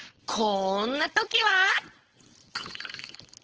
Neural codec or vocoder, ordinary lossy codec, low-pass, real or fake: codec, 16 kHz, 4.8 kbps, FACodec; Opus, 16 kbps; 7.2 kHz; fake